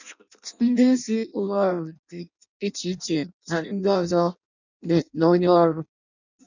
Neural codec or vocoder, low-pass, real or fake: codec, 16 kHz in and 24 kHz out, 0.6 kbps, FireRedTTS-2 codec; 7.2 kHz; fake